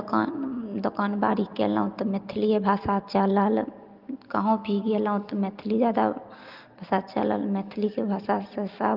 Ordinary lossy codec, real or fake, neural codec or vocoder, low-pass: Opus, 32 kbps; real; none; 5.4 kHz